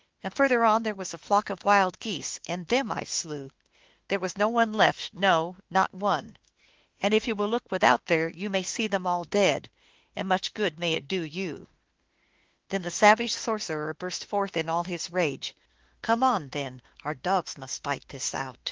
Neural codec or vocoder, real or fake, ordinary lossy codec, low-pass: autoencoder, 48 kHz, 32 numbers a frame, DAC-VAE, trained on Japanese speech; fake; Opus, 16 kbps; 7.2 kHz